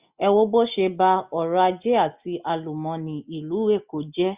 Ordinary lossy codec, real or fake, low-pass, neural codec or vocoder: Opus, 64 kbps; real; 3.6 kHz; none